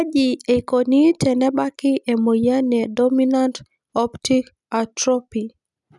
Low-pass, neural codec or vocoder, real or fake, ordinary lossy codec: 10.8 kHz; none; real; none